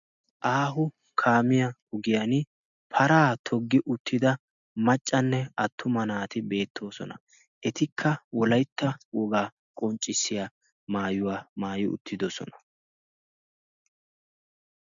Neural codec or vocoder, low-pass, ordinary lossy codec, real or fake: none; 7.2 kHz; MP3, 96 kbps; real